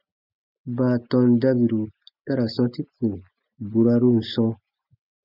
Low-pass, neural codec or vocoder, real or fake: 5.4 kHz; none; real